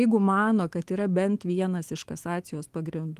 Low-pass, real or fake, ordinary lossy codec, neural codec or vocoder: 14.4 kHz; fake; Opus, 24 kbps; autoencoder, 48 kHz, 128 numbers a frame, DAC-VAE, trained on Japanese speech